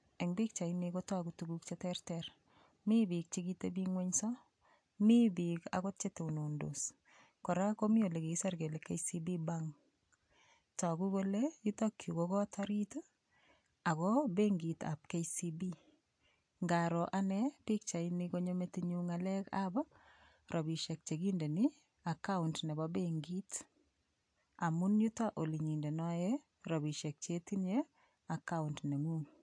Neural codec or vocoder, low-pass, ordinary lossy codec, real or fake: none; 9.9 kHz; AAC, 64 kbps; real